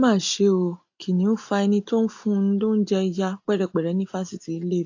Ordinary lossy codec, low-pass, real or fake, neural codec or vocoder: AAC, 48 kbps; 7.2 kHz; real; none